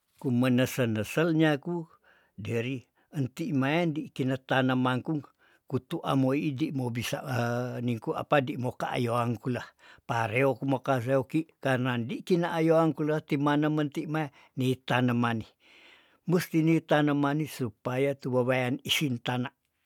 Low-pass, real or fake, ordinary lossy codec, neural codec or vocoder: 19.8 kHz; real; none; none